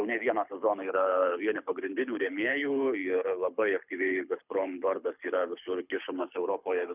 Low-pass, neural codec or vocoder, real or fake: 3.6 kHz; codec, 24 kHz, 6 kbps, HILCodec; fake